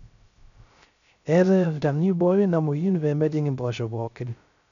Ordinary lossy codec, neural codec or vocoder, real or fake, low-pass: MP3, 96 kbps; codec, 16 kHz, 0.3 kbps, FocalCodec; fake; 7.2 kHz